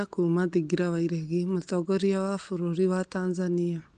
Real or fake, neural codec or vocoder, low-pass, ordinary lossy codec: real; none; 9.9 kHz; Opus, 24 kbps